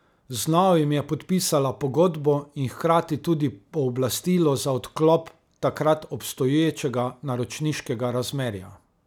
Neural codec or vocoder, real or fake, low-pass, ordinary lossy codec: none; real; 19.8 kHz; none